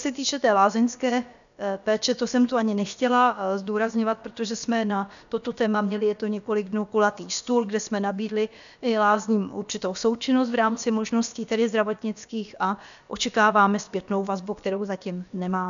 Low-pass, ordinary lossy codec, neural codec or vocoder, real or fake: 7.2 kHz; MP3, 96 kbps; codec, 16 kHz, about 1 kbps, DyCAST, with the encoder's durations; fake